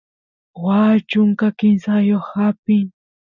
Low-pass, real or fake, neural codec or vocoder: 7.2 kHz; real; none